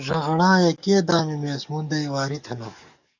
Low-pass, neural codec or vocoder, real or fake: 7.2 kHz; codec, 44.1 kHz, 7.8 kbps, DAC; fake